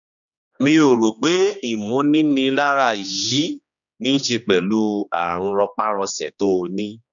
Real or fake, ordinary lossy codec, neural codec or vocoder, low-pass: fake; none; codec, 16 kHz, 2 kbps, X-Codec, HuBERT features, trained on general audio; 7.2 kHz